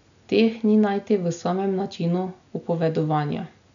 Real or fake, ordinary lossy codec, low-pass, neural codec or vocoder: real; none; 7.2 kHz; none